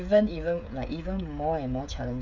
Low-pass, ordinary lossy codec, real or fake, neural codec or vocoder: 7.2 kHz; none; fake; codec, 16 kHz, 16 kbps, FreqCodec, smaller model